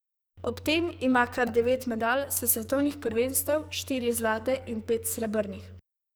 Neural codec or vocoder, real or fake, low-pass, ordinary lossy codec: codec, 44.1 kHz, 2.6 kbps, SNAC; fake; none; none